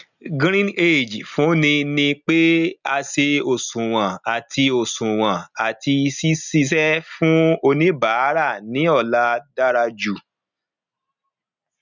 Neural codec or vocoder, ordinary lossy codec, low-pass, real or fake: none; none; 7.2 kHz; real